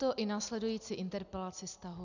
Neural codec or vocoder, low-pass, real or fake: none; 7.2 kHz; real